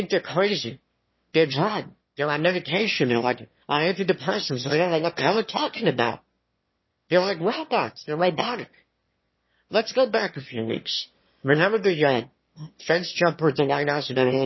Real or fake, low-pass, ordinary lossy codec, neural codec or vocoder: fake; 7.2 kHz; MP3, 24 kbps; autoencoder, 22.05 kHz, a latent of 192 numbers a frame, VITS, trained on one speaker